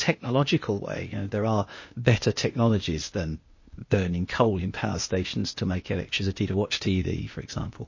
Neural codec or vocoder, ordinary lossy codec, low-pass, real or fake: codec, 16 kHz, 0.8 kbps, ZipCodec; MP3, 32 kbps; 7.2 kHz; fake